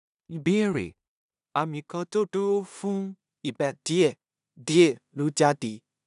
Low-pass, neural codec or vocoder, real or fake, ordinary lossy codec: 10.8 kHz; codec, 16 kHz in and 24 kHz out, 0.4 kbps, LongCat-Audio-Codec, two codebook decoder; fake; none